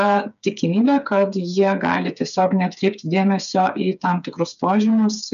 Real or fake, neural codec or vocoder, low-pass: fake; codec, 16 kHz, 8 kbps, FreqCodec, smaller model; 7.2 kHz